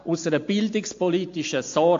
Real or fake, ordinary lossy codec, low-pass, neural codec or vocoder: real; AAC, 48 kbps; 7.2 kHz; none